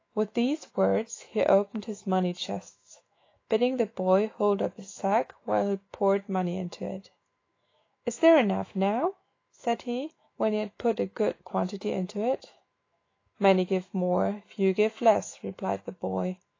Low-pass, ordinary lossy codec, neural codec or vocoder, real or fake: 7.2 kHz; AAC, 32 kbps; autoencoder, 48 kHz, 128 numbers a frame, DAC-VAE, trained on Japanese speech; fake